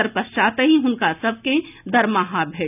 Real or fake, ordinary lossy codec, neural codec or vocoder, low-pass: real; none; none; 3.6 kHz